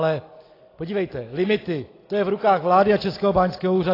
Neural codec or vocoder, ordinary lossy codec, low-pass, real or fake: none; AAC, 24 kbps; 5.4 kHz; real